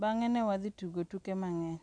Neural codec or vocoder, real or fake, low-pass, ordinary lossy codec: none; real; 9.9 kHz; none